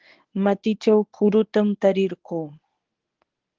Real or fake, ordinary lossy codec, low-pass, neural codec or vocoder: fake; Opus, 16 kbps; 7.2 kHz; codec, 24 kHz, 0.9 kbps, WavTokenizer, medium speech release version 1